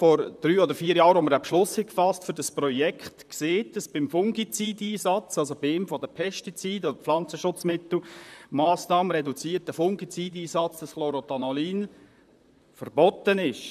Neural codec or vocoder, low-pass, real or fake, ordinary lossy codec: vocoder, 44.1 kHz, 128 mel bands, Pupu-Vocoder; 14.4 kHz; fake; none